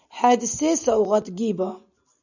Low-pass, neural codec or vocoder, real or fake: 7.2 kHz; none; real